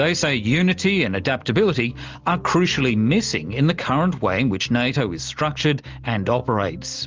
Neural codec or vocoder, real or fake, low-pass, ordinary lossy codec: none; real; 7.2 kHz; Opus, 32 kbps